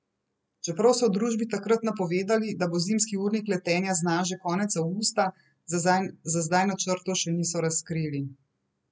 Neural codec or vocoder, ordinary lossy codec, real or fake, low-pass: none; none; real; none